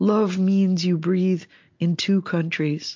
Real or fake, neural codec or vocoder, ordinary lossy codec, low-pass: real; none; MP3, 48 kbps; 7.2 kHz